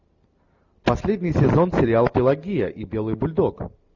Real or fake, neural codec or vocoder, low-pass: real; none; 7.2 kHz